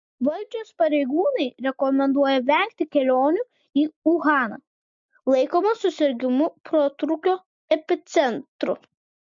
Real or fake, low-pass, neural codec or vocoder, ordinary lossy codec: real; 7.2 kHz; none; MP3, 48 kbps